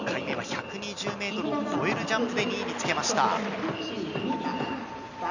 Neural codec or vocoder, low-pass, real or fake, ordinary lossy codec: none; 7.2 kHz; real; none